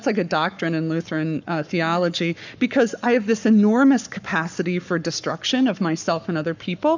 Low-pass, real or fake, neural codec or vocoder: 7.2 kHz; fake; codec, 44.1 kHz, 7.8 kbps, Pupu-Codec